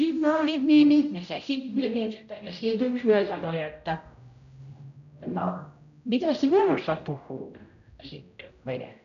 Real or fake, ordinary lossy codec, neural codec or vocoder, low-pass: fake; none; codec, 16 kHz, 0.5 kbps, X-Codec, HuBERT features, trained on general audio; 7.2 kHz